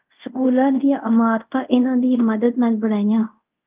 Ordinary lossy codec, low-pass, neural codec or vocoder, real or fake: Opus, 24 kbps; 3.6 kHz; codec, 24 kHz, 0.5 kbps, DualCodec; fake